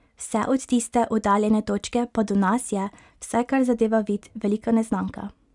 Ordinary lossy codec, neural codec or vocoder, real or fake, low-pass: none; none; real; 10.8 kHz